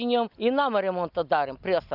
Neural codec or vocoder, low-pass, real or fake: none; 5.4 kHz; real